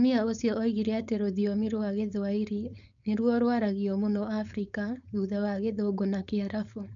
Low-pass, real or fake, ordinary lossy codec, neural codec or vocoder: 7.2 kHz; fake; none; codec, 16 kHz, 4.8 kbps, FACodec